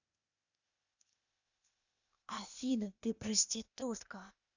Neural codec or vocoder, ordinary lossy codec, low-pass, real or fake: codec, 16 kHz, 0.8 kbps, ZipCodec; none; 7.2 kHz; fake